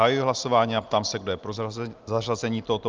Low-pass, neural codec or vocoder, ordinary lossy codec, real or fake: 7.2 kHz; none; Opus, 32 kbps; real